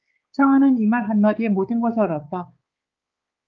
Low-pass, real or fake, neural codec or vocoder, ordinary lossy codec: 7.2 kHz; fake; codec, 16 kHz, 4 kbps, X-Codec, HuBERT features, trained on balanced general audio; Opus, 32 kbps